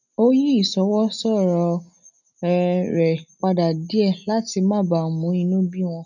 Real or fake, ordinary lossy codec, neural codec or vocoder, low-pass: real; none; none; 7.2 kHz